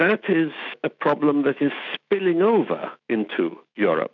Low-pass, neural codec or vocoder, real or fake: 7.2 kHz; autoencoder, 48 kHz, 128 numbers a frame, DAC-VAE, trained on Japanese speech; fake